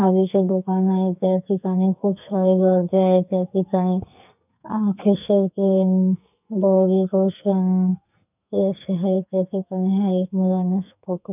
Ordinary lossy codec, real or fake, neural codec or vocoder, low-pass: none; fake; codec, 44.1 kHz, 2.6 kbps, SNAC; 3.6 kHz